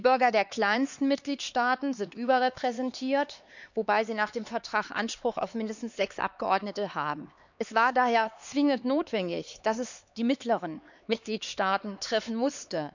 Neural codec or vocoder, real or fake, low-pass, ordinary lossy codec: codec, 16 kHz, 4 kbps, X-Codec, HuBERT features, trained on LibriSpeech; fake; 7.2 kHz; none